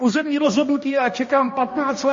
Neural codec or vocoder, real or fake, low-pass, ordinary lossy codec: codec, 16 kHz, 1 kbps, X-Codec, HuBERT features, trained on balanced general audio; fake; 7.2 kHz; MP3, 32 kbps